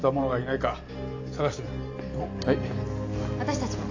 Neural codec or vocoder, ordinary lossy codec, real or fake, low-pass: none; AAC, 48 kbps; real; 7.2 kHz